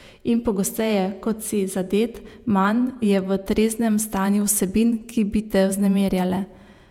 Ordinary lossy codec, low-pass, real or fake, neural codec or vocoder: none; 19.8 kHz; fake; vocoder, 48 kHz, 128 mel bands, Vocos